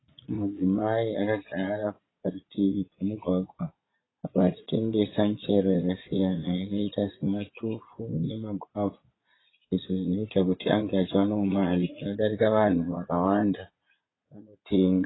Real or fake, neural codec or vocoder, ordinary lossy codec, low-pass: fake; vocoder, 22.05 kHz, 80 mel bands, Vocos; AAC, 16 kbps; 7.2 kHz